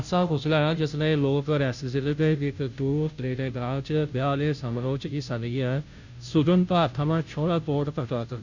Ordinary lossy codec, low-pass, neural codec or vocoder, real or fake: none; 7.2 kHz; codec, 16 kHz, 0.5 kbps, FunCodec, trained on Chinese and English, 25 frames a second; fake